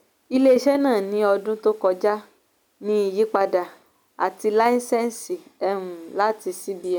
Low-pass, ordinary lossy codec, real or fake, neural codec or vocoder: none; none; real; none